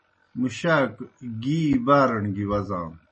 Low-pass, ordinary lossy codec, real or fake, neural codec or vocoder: 9.9 kHz; MP3, 32 kbps; real; none